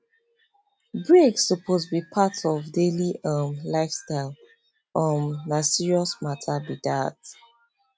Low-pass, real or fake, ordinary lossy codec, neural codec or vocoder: none; real; none; none